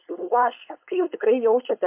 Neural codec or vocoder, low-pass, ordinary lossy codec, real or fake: codec, 16 kHz, 4.8 kbps, FACodec; 3.6 kHz; MP3, 32 kbps; fake